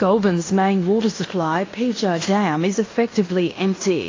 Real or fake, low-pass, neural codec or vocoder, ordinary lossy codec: fake; 7.2 kHz; codec, 16 kHz in and 24 kHz out, 0.9 kbps, LongCat-Audio-Codec, fine tuned four codebook decoder; AAC, 32 kbps